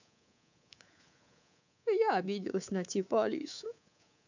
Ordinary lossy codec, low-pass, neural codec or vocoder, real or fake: none; 7.2 kHz; codec, 24 kHz, 3.1 kbps, DualCodec; fake